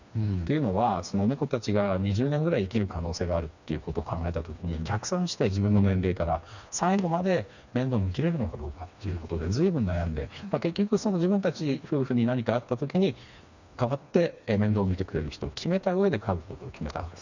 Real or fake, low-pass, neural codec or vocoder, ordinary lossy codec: fake; 7.2 kHz; codec, 16 kHz, 2 kbps, FreqCodec, smaller model; none